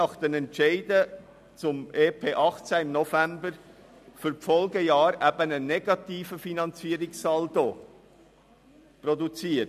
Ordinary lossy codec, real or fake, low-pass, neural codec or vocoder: none; real; 14.4 kHz; none